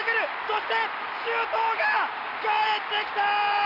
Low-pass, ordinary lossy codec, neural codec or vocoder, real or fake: 5.4 kHz; AAC, 48 kbps; none; real